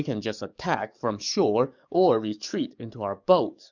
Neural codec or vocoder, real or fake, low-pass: codec, 44.1 kHz, 7.8 kbps, DAC; fake; 7.2 kHz